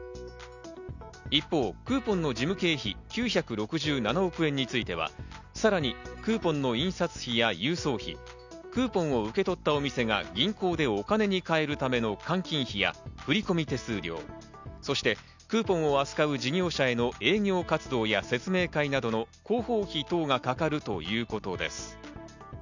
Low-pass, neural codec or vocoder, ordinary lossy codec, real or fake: 7.2 kHz; none; none; real